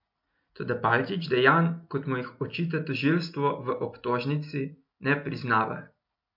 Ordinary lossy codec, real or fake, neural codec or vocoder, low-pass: MP3, 48 kbps; real; none; 5.4 kHz